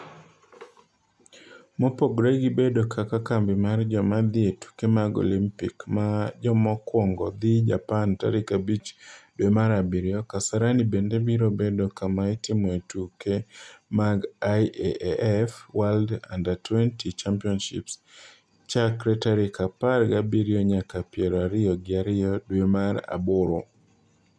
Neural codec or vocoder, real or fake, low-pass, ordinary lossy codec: none; real; none; none